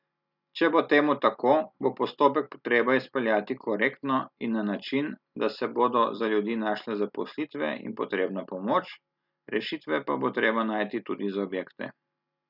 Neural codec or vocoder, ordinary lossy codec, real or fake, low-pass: none; none; real; 5.4 kHz